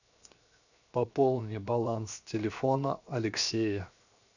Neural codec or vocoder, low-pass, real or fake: codec, 16 kHz, 0.7 kbps, FocalCodec; 7.2 kHz; fake